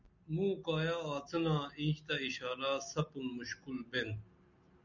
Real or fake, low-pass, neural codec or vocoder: real; 7.2 kHz; none